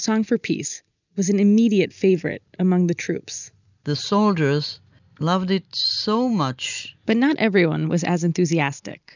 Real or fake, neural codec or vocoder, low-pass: real; none; 7.2 kHz